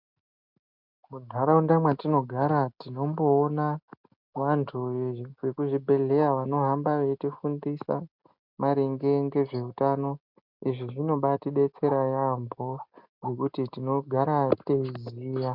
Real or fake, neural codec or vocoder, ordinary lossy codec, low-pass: real; none; MP3, 48 kbps; 5.4 kHz